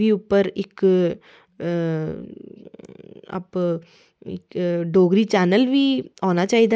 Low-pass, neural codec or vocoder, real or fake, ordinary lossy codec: none; none; real; none